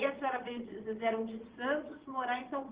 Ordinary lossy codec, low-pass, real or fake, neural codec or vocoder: Opus, 16 kbps; 3.6 kHz; fake; vocoder, 22.05 kHz, 80 mel bands, Vocos